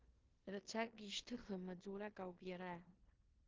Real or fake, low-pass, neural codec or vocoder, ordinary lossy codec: fake; 7.2 kHz; codec, 16 kHz in and 24 kHz out, 0.9 kbps, LongCat-Audio-Codec, four codebook decoder; Opus, 16 kbps